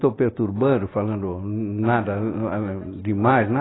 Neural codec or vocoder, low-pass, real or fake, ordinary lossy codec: none; 7.2 kHz; real; AAC, 16 kbps